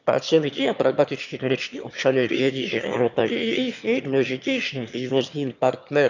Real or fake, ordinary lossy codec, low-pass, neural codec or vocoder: fake; none; 7.2 kHz; autoencoder, 22.05 kHz, a latent of 192 numbers a frame, VITS, trained on one speaker